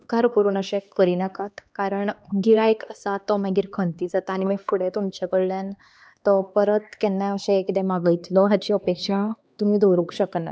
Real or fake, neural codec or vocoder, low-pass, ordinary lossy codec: fake; codec, 16 kHz, 2 kbps, X-Codec, HuBERT features, trained on LibriSpeech; none; none